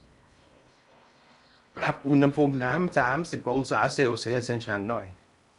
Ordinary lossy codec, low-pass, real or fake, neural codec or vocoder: none; 10.8 kHz; fake; codec, 16 kHz in and 24 kHz out, 0.6 kbps, FocalCodec, streaming, 4096 codes